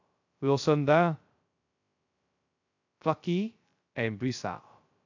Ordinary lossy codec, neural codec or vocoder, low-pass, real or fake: AAC, 48 kbps; codec, 16 kHz, 0.2 kbps, FocalCodec; 7.2 kHz; fake